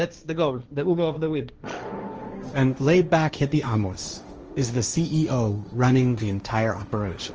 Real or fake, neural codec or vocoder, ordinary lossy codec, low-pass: fake; codec, 16 kHz, 1.1 kbps, Voila-Tokenizer; Opus, 24 kbps; 7.2 kHz